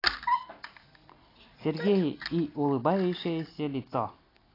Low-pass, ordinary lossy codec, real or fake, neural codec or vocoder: 5.4 kHz; AAC, 24 kbps; real; none